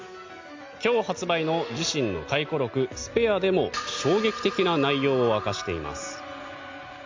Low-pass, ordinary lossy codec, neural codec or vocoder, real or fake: 7.2 kHz; MP3, 64 kbps; none; real